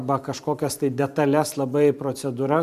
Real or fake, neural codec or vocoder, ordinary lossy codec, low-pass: fake; vocoder, 44.1 kHz, 128 mel bands every 512 samples, BigVGAN v2; MP3, 96 kbps; 14.4 kHz